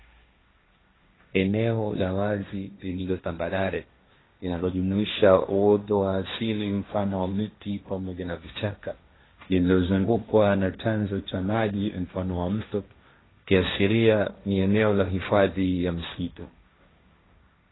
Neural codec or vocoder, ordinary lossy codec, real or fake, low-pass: codec, 16 kHz, 1.1 kbps, Voila-Tokenizer; AAC, 16 kbps; fake; 7.2 kHz